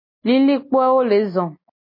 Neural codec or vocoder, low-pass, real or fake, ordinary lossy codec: none; 5.4 kHz; real; MP3, 24 kbps